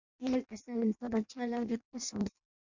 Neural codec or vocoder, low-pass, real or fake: codec, 16 kHz in and 24 kHz out, 0.6 kbps, FireRedTTS-2 codec; 7.2 kHz; fake